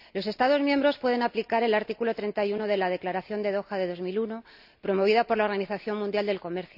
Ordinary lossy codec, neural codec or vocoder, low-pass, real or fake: none; none; 5.4 kHz; real